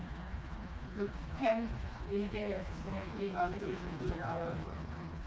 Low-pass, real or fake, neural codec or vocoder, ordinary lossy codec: none; fake; codec, 16 kHz, 2 kbps, FreqCodec, smaller model; none